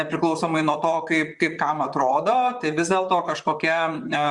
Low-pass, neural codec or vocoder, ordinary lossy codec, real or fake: 10.8 kHz; vocoder, 44.1 kHz, 128 mel bands, Pupu-Vocoder; Opus, 64 kbps; fake